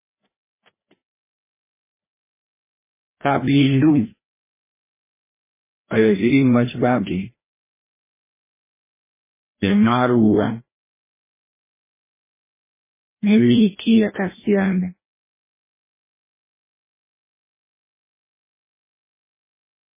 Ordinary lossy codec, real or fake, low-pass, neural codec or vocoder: MP3, 16 kbps; fake; 3.6 kHz; codec, 16 kHz, 1 kbps, FreqCodec, larger model